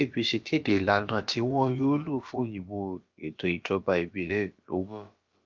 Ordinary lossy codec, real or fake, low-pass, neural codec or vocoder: Opus, 24 kbps; fake; 7.2 kHz; codec, 16 kHz, about 1 kbps, DyCAST, with the encoder's durations